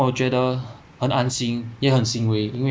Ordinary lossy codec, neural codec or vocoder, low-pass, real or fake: none; none; none; real